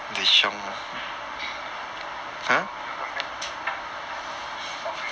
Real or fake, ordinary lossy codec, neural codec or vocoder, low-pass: real; none; none; none